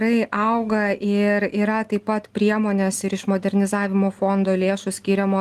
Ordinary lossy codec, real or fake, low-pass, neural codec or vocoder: Opus, 24 kbps; real; 14.4 kHz; none